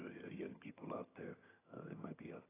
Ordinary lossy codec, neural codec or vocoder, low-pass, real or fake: AAC, 16 kbps; vocoder, 22.05 kHz, 80 mel bands, HiFi-GAN; 3.6 kHz; fake